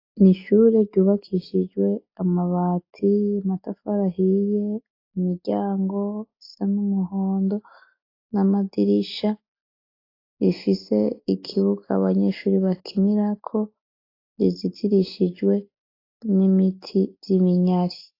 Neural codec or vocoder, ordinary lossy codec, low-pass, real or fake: none; AAC, 32 kbps; 5.4 kHz; real